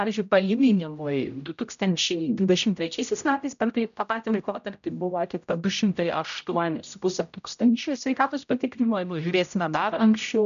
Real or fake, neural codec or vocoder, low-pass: fake; codec, 16 kHz, 0.5 kbps, X-Codec, HuBERT features, trained on general audio; 7.2 kHz